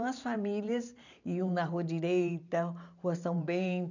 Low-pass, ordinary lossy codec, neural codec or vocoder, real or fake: 7.2 kHz; none; vocoder, 44.1 kHz, 128 mel bands every 512 samples, BigVGAN v2; fake